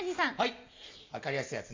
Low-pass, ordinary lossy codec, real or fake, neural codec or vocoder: 7.2 kHz; MP3, 64 kbps; real; none